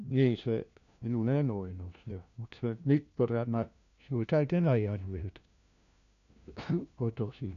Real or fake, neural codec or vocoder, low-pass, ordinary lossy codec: fake; codec, 16 kHz, 1 kbps, FunCodec, trained on LibriTTS, 50 frames a second; 7.2 kHz; none